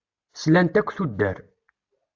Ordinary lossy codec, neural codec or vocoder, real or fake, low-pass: AAC, 48 kbps; none; real; 7.2 kHz